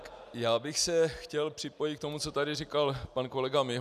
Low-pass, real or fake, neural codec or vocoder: 14.4 kHz; real; none